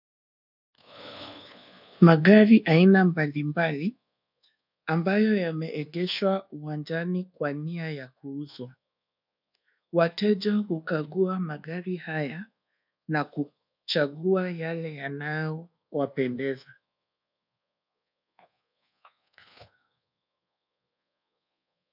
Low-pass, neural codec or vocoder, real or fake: 5.4 kHz; codec, 24 kHz, 1.2 kbps, DualCodec; fake